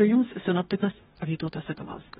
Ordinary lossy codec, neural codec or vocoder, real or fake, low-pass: AAC, 16 kbps; codec, 44.1 kHz, 2.6 kbps, DAC; fake; 19.8 kHz